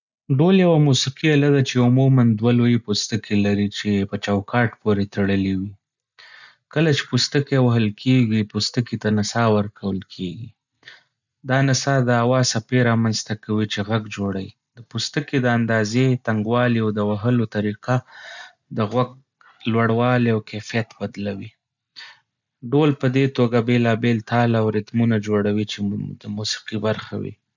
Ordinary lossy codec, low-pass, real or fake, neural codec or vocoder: none; 7.2 kHz; real; none